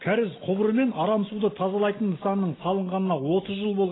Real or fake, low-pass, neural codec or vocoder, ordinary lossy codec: real; 7.2 kHz; none; AAC, 16 kbps